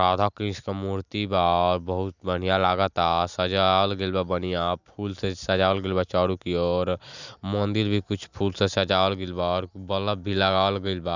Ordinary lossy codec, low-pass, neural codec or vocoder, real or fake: none; 7.2 kHz; none; real